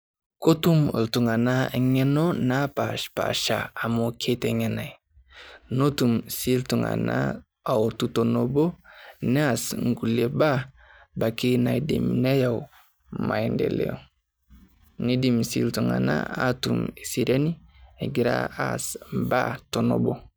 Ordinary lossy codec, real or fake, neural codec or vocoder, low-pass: none; real; none; none